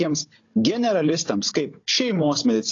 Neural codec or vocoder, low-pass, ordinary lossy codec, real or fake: codec, 16 kHz, 16 kbps, FunCodec, trained on Chinese and English, 50 frames a second; 7.2 kHz; AAC, 32 kbps; fake